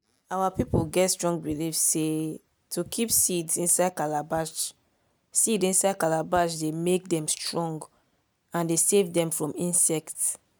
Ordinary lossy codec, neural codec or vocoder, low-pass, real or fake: none; none; none; real